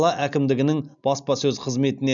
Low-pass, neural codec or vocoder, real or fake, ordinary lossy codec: 7.2 kHz; none; real; none